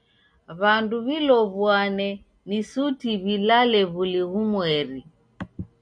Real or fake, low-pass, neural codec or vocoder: real; 9.9 kHz; none